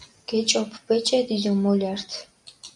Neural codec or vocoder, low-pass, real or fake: none; 10.8 kHz; real